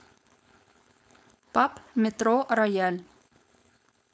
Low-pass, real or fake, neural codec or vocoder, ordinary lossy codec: none; fake; codec, 16 kHz, 4.8 kbps, FACodec; none